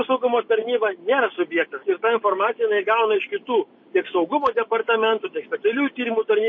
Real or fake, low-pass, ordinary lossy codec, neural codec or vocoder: real; 7.2 kHz; MP3, 32 kbps; none